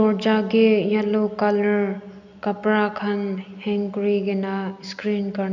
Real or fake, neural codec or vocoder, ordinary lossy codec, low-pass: real; none; none; 7.2 kHz